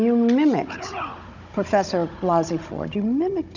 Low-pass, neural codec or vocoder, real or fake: 7.2 kHz; codec, 16 kHz, 16 kbps, FunCodec, trained on Chinese and English, 50 frames a second; fake